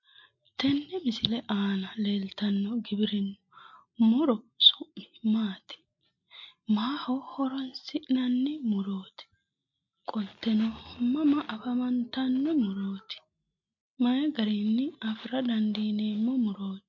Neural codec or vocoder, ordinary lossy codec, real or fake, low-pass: none; MP3, 48 kbps; real; 7.2 kHz